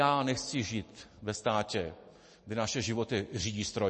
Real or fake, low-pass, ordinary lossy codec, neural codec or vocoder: real; 10.8 kHz; MP3, 32 kbps; none